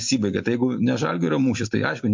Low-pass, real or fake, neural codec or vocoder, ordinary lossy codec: 7.2 kHz; real; none; MP3, 48 kbps